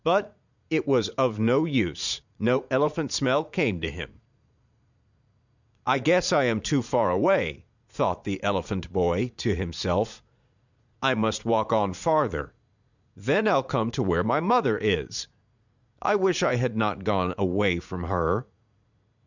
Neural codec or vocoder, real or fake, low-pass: vocoder, 44.1 kHz, 80 mel bands, Vocos; fake; 7.2 kHz